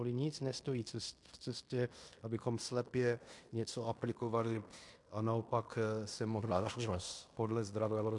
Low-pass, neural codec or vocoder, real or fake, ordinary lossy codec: 10.8 kHz; codec, 16 kHz in and 24 kHz out, 0.9 kbps, LongCat-Audio-Codec, fine tuned four codebook decoder; fake; MP3, 64 kbps